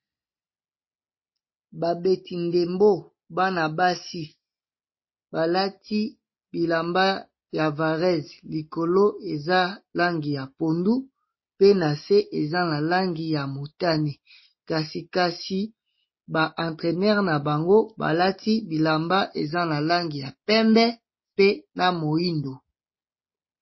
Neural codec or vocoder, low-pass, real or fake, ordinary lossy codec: none; 7.2 kHz; real; MP3, 24 kbps